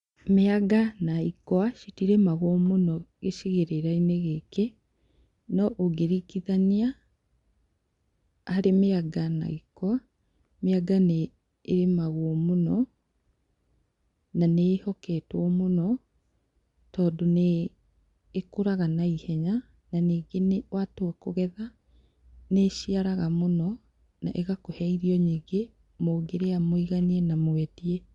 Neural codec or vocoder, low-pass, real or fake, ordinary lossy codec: none; 10.8 kHz; real; Opus, 64 kbps